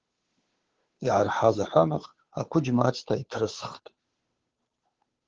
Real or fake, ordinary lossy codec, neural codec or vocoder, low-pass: fake; Opus, 16 kbps; codec, 16 kHz, 2 kbps, FunCodec, trained on Chinese and English, 25 frames a second; 7.2 kHz